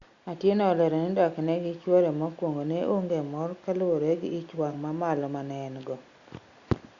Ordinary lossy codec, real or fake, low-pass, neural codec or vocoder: Opus, 64 kbps; real; 7.2 kHz; none